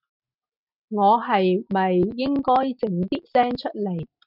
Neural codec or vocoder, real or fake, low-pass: none; real; 5.4 kHz